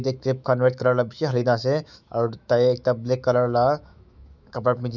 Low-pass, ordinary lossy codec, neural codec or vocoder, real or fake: 7.2 kHz; none; autoencoder, 48 kHz, 128 numbers a frame, DAC-VAE, trained on Japanese speech; fake